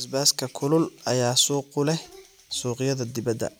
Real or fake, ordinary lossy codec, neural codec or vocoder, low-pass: real; none; none; none